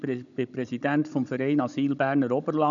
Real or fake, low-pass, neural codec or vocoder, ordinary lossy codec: fake; 7.2 kHz; codec, 16 kHz, 16 kbps, FunCodec, trained on Chinese and English, 50 frames a second; none